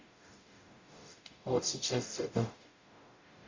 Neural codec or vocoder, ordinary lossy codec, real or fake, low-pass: codec, 44.1 kHz, 0.9 kbps, DAC; MP3, 64 kbps; fake; 7.2 kHz